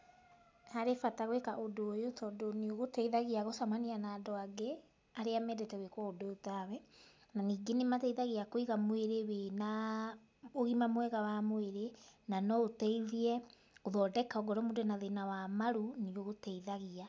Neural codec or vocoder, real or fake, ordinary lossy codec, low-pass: none; real; none; none